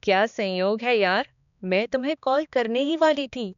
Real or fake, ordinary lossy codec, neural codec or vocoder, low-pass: fake; none; codec, 16 kHz, 2 kbps, X-Codec, HuBERT features, trained on balanced general audio; 7.2 kHz